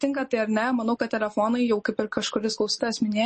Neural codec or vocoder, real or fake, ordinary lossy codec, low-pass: none; real; MP3, 32 kbps; 10.8 kHz